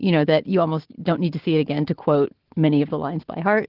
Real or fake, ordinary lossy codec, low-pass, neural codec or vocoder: real; Opus, 16 kbps; 5.4 kHz; none